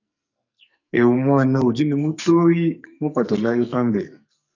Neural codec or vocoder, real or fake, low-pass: codec, 44.1 kHz, 2.6 kbps, SNAC; fake; 7.2 kHz